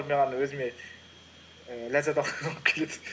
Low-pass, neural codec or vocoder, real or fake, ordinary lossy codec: none; none; real; none